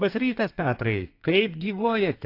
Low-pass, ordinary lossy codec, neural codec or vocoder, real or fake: 5.4 kHz; AAC, 32 kbps; codec, 32 kHz, 1.9 kbps, SNAC; fake